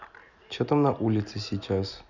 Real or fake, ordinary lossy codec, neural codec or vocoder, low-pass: real; none; none; 7.2 kHz